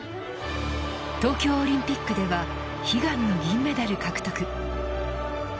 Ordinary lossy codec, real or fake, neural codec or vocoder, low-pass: none; real; none; none